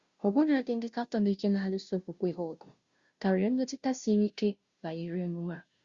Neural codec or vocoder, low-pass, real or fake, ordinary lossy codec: codec, 16 kHz, 0.5 kbps, FunCodec, trained on Chinese and English, 25 frames a second; 7.2 kHz; fake; Opus, 64 kbps